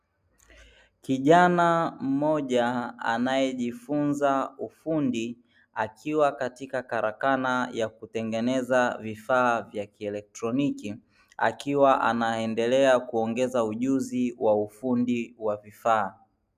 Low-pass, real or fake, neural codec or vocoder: 14.4 kHz; real; none